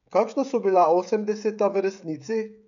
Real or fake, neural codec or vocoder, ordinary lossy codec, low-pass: fake; codec, 16 kHz, 16 kbps, FreqCodec, smaller model; none; 7.2 kHz